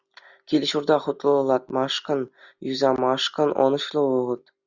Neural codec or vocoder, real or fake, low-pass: none; real; 7.2 kHz